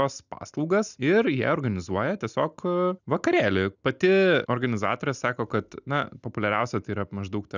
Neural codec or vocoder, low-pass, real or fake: none; 7.2 kHz; real